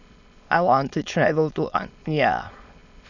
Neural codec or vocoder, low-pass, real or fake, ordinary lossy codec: autoencoder, 22.05 kHz, a latent of 192 numbers a frame, VITS, trained on many speakers; 7.2 kHz; fake; none